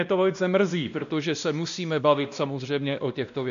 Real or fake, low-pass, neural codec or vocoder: fake; 7.2 kHz; codec, 16 kHz, 1 kbps, X-Codec, WavLM features, trained on Multilingual LibriSpeech